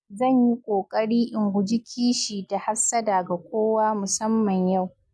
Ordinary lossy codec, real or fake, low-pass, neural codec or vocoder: none; real; none; none